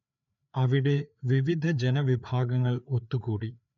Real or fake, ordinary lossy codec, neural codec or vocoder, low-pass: fake; none; codec, 16 kHz, 4 kbps, FreqCodec, larger model; 7.2 kHz